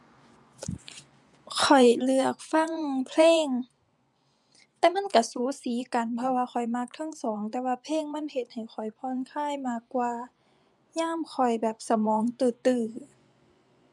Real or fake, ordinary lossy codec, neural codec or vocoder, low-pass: fake; none; vocoder, 24 kHz, 100 mel bands, Vocos; none